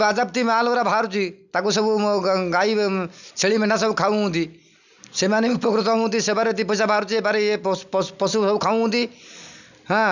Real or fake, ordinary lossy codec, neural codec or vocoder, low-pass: real; none; none; 7.2 kHz